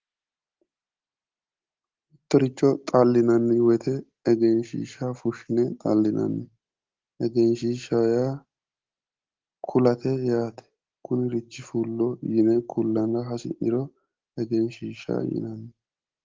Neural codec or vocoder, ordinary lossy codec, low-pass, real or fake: none; Opus, 16 kbps; 7.2 kHz; real